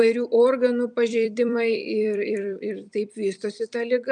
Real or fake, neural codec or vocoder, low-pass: fake; vocoder, 44.1 kHz, 128 mel bands every 256 samples, BigVGAN v2; 10.8 kHz